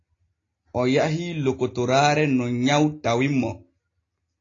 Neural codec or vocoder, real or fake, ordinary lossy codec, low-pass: none; real; AAC, 32 kbps; 7.2 kHz